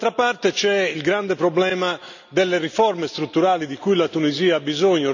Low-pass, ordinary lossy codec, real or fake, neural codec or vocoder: 7.2 kHz; none; real; none